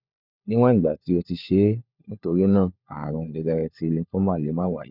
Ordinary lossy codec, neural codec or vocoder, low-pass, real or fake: none; codec, 16 kHz, 4 kbps, FunCodec, trained on LibriTTS, 50 frames a second; 5.4 kHz; fake